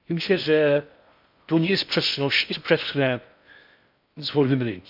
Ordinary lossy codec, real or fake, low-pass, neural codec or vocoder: AAC, 48 kbps; fake; 5.4 kHz; codec, 16 kHz in and 24 kHz out, 0.6 kbps, FocalCodec, streaming, 4096 codes